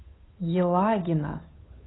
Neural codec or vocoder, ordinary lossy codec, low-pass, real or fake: codec, 16 kHz, 8 kbps, FunCodec, trained on Chinese and English, 25 frames a second; AAC, 16 kbps; 7.2 kHz; fake